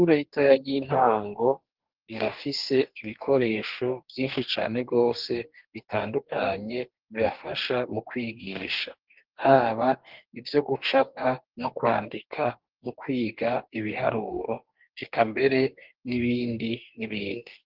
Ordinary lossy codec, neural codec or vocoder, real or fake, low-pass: Opus, 16 kbps; codec, 44.1 kHz, 2.6 kbps, DAC; fake; 5.4 kHz